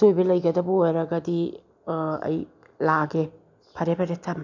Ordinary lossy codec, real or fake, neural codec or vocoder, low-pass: none; real; none; 7.2 kHz